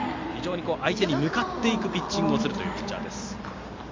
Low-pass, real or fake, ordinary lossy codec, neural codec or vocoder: 7.2 kHz; real; MP3, 48 kbps; none